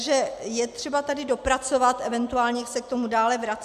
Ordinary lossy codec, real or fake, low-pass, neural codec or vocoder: MP3, 96 kbps; real; 14.4 kHz; none